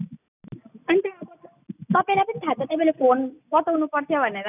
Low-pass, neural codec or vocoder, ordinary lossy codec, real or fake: 3.6 kHz; none; none; real